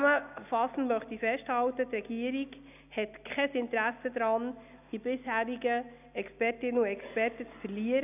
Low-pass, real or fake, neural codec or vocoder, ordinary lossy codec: 3.6 kHz; real; none; none